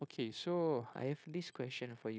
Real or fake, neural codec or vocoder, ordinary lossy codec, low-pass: fake; codec, 16 kHz, 0.9 kbps, LongCat-Audio-Codec; none; none